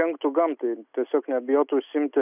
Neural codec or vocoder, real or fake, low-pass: none; real; 3.6 kHz